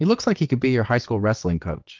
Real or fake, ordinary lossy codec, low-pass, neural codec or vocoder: fake; Opus, 32 kbps; 7.2 kHz; vocoder, 22.05 kHz, 80 mel bands, WaveNeXt